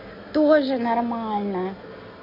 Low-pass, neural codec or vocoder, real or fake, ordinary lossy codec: 5.4 kHz; none; real; MP3, 32 kbps